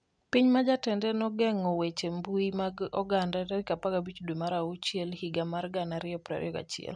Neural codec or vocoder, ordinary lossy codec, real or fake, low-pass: none; none; real; 9.9 kHz